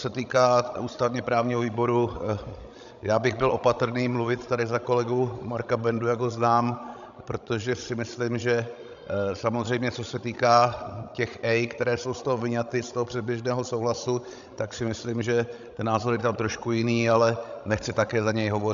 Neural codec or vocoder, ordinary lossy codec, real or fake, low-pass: codec, 16 kHz, 16 kbps, FreqCodec, larger model; AAC, 96 kbps; fake; 7.2 kHz